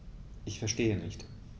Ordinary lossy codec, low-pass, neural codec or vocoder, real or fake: none; none; none; real